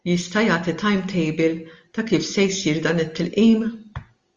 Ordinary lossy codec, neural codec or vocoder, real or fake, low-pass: Opus, 32 kbps; none; real; 7.2 kHz